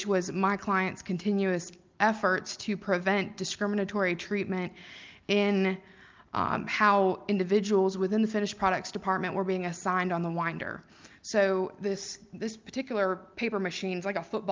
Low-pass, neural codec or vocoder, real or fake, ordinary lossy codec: 7.2 kHz; none; real; Opus, 24 kbps